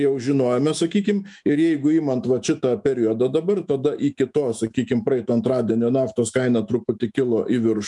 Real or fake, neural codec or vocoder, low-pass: fake; autoencoder, 48 kHz, 128 numbers a frame, DAC-VAE, trained on Japanese speech; 10.8 kHz